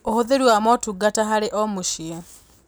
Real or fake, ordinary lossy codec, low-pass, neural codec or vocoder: real; none; none; none